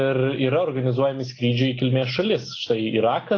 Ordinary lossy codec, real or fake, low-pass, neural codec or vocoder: AAC, 32 kbps; real; 7.2 kHz; none